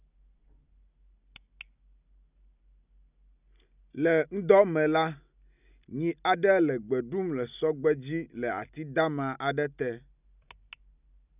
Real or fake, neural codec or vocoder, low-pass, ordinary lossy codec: real; none; 3.6 kHz; none